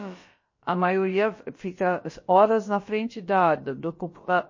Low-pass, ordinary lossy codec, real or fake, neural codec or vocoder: 7.2 kHz; MP3, 32 kbps; fake; codec, 16 kHz, about 1 kbps, DyCAST, with the encoder's durations